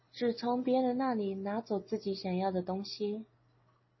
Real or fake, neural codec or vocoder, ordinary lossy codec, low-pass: real; none; MP3, 24 kbps; 7.2 kHz